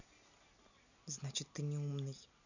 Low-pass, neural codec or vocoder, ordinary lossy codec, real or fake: 7.2 kHz; none; none; real